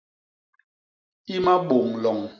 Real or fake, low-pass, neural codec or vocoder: real; 7.2 kHz; none